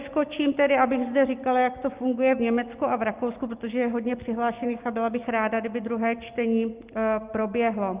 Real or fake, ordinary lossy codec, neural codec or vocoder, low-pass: real; Opus, 24 kbps; none; 3.6 kHz